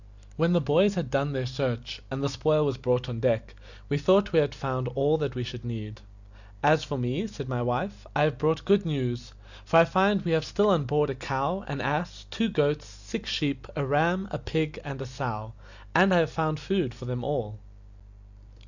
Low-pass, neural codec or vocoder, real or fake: 7.2 kHz; none; real